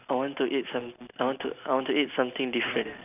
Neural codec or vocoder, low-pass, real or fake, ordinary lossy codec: none; 3.6 kHz; real; none